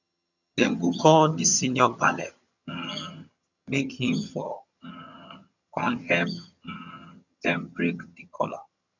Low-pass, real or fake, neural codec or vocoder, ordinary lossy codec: 7.2 kHz; fake; vocoder, 22.05 kHz, 80 mel bands, HiFi-GAN; none